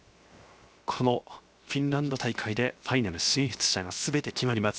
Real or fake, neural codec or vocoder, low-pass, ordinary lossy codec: fake; codec, 16 kHz, 0.7 kbps, FocalCodec; none; none